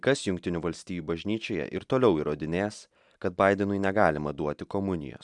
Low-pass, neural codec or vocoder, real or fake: 10.8 kHz; none; real